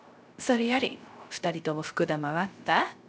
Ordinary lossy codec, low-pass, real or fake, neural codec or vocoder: none; none; fake; codec, 16 kHz, 0.3 kbps, FocalCodec